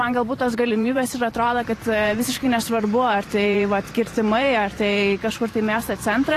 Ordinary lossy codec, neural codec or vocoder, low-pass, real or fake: AAC, 48 kbps; vocoder, 44.1 kHz, 128 mel bands every 512 samples, BigVGAN v2; 14.4 kHz; fake